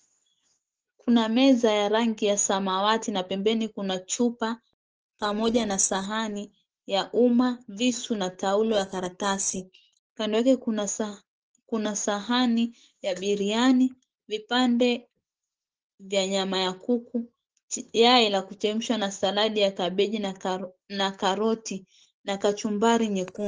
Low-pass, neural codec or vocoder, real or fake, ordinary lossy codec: 7.2 kHz; autoencoder, 48 kHz, 128 numbers a frame, DAC-VAE, trained on Japanese speech; fake; Opus, 16 kbps